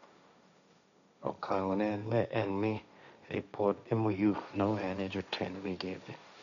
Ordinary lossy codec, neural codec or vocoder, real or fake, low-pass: none; codec, 16 kHz, 1.1 kbps, Voila-Tokenizer; fake; 7.2 kHz